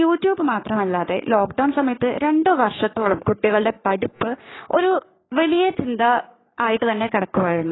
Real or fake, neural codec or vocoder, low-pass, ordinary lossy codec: fake; codec, 44.1 kHz, 3.4 kbps, Pupu-Codec; 7.2 kHz; AAC, 16 kbps